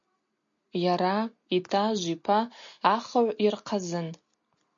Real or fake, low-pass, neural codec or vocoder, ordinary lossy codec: real; 7.2 kHz; none; MP3, 48 kbps